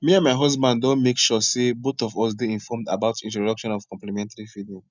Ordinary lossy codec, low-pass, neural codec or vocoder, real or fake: none; 7.2 kHz; none; real